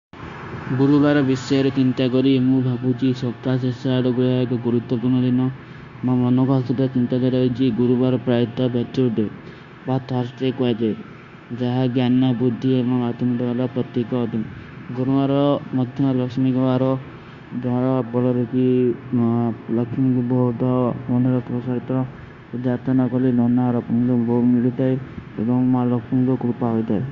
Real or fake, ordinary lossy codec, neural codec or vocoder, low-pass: fake; Opus, 64 kbps; codec, 16 kHz, 0.9 kbps, LongCat-Audio-Codec; 7.2 kHz